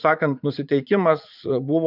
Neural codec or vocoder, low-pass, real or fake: vocoder, 44.1 kHz, 80 mel bands, Vocos; 5.4 kHz; fake